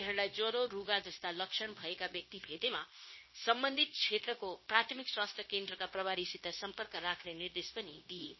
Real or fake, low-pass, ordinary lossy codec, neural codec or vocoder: fake; 7.2 kHz; MP3, 24 kbps; codec, 16 kHz, 0.9 kbps, LongCat-Audio-Codec